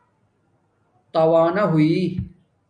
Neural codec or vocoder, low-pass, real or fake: none; 9.9 kHz; real